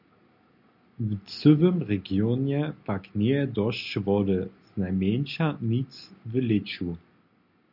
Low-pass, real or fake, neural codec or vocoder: 5.4 kHz; real; none